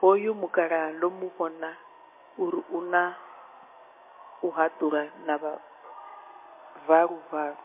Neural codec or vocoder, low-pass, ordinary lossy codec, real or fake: none; 3.6 kHz; MP3, 24 kbps; real